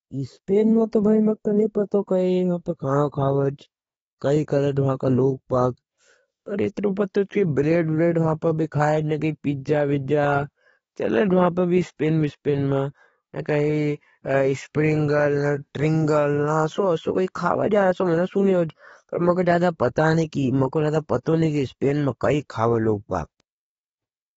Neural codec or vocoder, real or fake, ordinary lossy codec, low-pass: codec, 44.1 kHz, 7.8 kbps, DAC; fake; AAC, 24 kbps; 19.8 kHz